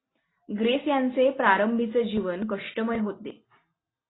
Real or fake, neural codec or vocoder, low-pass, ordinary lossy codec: real; none; 7.2 kHz; AAC, 16 kbps